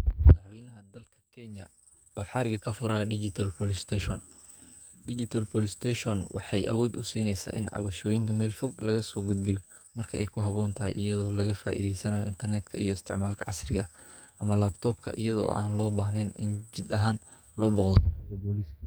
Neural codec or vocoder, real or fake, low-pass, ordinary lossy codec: codec, 44.1 kHz, 2.6 kbps, SNAC; fake; none; none